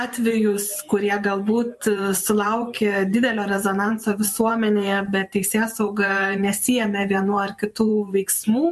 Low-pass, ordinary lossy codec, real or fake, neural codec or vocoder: 14.4 kHz; MP3, 64 kbps; fake; vocoder, 44.1 kHz, 128 mel bands every 512 samples, BigVGAN v2